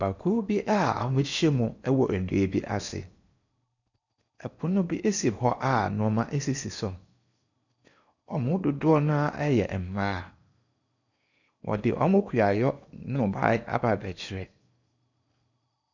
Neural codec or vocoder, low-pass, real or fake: codec, 16 kHz in and 24 kHz out, 0.8 kbps, FocalCodec, streaming, 65536 codes; 7.2 kHz; fake